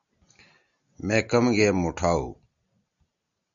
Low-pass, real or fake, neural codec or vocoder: 7.2 kHz; real; none